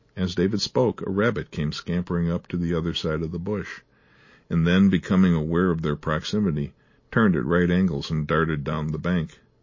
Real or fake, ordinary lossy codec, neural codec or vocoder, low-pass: real; MP3, 32 kbps; none; 7.2 kHz